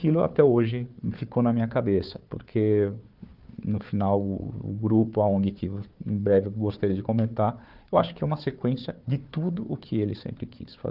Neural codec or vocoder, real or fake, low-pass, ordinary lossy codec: codec, 16 kHz, 4 kbps, FunCodec, trained on Chinese and English, 50 frames a second; fake; 5.4 kHz; Opus, 24 kbps